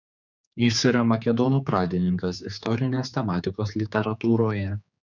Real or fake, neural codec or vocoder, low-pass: fake; codec, 16 kHz, 4 kbps, X-Codec, HuBERT features, trained on general audio; 7.2 kHz